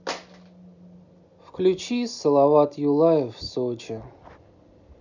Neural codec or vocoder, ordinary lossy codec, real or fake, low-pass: none; none; real; 7.2 kHz